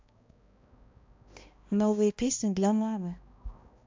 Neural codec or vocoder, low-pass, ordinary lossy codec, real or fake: codec, 16 kHz, 1 kbps, X-Codec, HuBERT features, trained on balanced general audio; 7.2 kHz; MP3, 64 kbps; fake